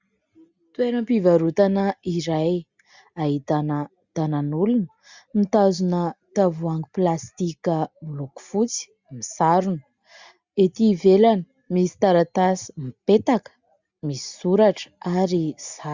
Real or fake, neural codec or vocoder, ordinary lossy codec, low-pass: real; none; Opus, 64 kbps; 7.2 kHz